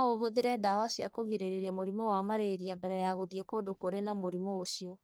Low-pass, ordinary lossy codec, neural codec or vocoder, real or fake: none; none; codec, 44.1 kHz, 1.7 kbps, Pupu-Codec; fake